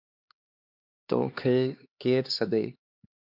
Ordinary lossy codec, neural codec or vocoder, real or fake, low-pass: MP3, 48 kbps; codec, 16 kHz, 2 kbps, X-Codec, HuBERT features, trained on LibriSpeech; fake; 5.4 kHz